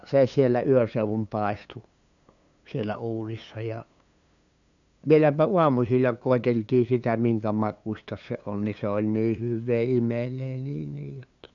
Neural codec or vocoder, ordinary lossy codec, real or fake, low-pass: codec, 16 kHz, 2 kbps, FunCodec, trained on LibriTTS, 25 frames a second; none; fake; 7.2 kHz